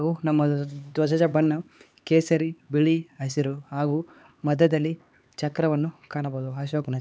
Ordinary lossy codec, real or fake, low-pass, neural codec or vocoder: none; fake; none; codec, 16 kHz, 4 kbps, X-Codec, HuBERT features, trained on LibriSpeech